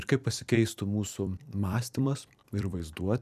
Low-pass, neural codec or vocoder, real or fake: 14.4 kHz; vocoder, 44.1 kHz, 128 mel bands every 256 samples, BigVGAN v2; fake